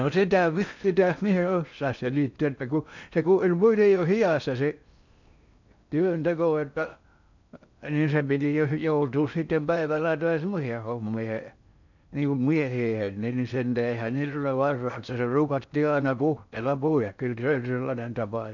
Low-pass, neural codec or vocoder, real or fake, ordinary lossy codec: 7.2 kHz; codec, 16 kHz in and 24 kHz out, 0.6 kbps, FocalCodec, streaming, 4096 codes; fake; none